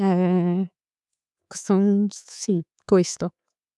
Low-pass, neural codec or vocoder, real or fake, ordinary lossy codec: none; codec, 24 kHz, 3.1 kbps, DualCodec; fake; none